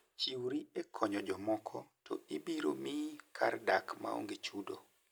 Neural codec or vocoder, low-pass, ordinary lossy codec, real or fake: none; none; none; real